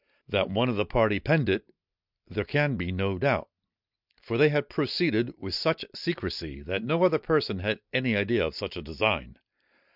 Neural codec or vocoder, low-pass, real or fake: none; 5.4 kHz; real